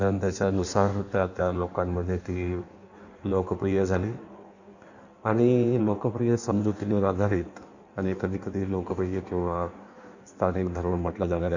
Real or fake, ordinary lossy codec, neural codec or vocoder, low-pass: fake; none; codec, 16 kHz in and 24 kHz out, 1.1 kbps, FireRedTTS-2 codec; 7.2 kHz